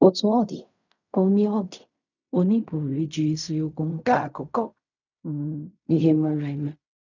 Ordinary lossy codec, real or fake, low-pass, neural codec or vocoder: none; fake; 7.2 kHz; codec, 16 kHz in and 24 kHz out, 0.4 kbps, LongCat-Audio-Codec, fine tuned four codebook decoder